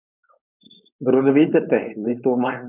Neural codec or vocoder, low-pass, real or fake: codec, 16 kHz, 4.8 kbps, FACodec; 3.6 kHz; fake